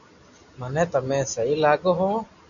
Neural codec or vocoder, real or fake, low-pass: none; real; 7.2 kHz